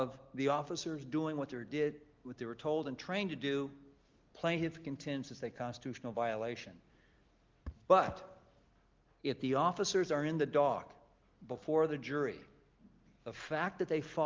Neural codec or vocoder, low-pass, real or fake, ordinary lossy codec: none; 7.2 kHz; real; Opus, 32 kbps